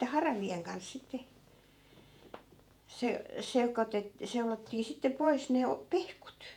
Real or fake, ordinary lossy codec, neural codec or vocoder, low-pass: fake; none; vocoder, 48 kHz, 128 mel bands, Vocos; 19.8 kHz